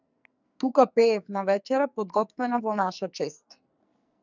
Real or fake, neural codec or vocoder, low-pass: fake; codec, 44.1 kHz, 2.6 kbps, SNAC; 7.2 kHz